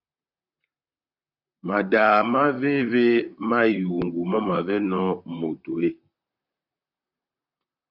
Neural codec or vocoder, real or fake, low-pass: vocoder, 44.1 kHz, 128 mel bands, Pupu-Vocoder; fake; 5.4 kHz